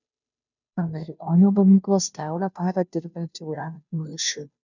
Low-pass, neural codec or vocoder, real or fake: 7.2 kHz; codec, 16 kHz, 0.5 kbps, FunCodec, trained on Chinese and English, 25 frames a second; fake